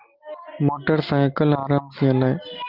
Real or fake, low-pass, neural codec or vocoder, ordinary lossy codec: real; 5.4 kHz; none; Opus, 24 kbps